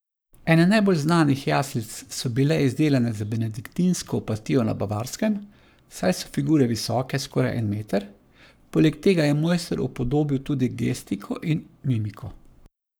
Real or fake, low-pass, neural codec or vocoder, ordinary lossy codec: fake; none; codec, 44.1 kHz, 7.8 kbps, Pupu-Codec; none